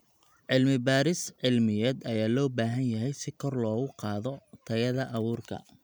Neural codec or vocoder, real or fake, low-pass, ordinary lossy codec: none; real; none; none